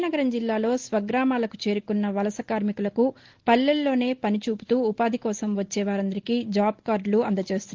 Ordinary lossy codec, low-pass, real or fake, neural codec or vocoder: Opus, 16 kbps; 7.2 kHz; real; none